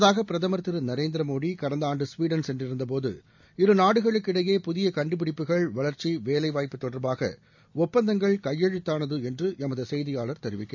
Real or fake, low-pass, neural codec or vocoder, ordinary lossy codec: real; 7.2 kHz; none; none